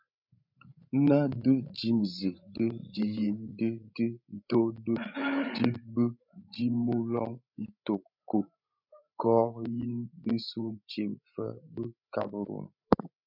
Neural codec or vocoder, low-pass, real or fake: codec, 16 kHz, 16 kbps, FreqCodec, larger model; 5.4 kHz; fake